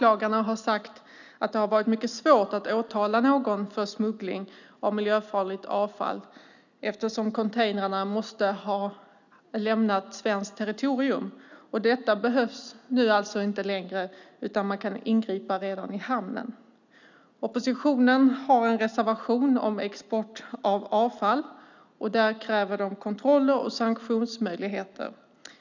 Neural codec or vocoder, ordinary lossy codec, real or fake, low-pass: none; none; real; 7.2 kHz